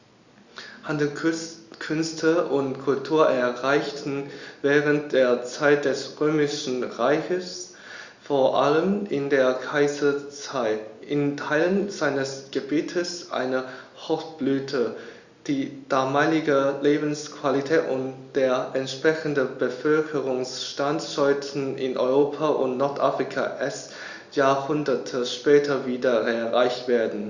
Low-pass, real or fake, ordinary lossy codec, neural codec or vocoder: 7.2 kHz; real; Opus, 64 kbps; none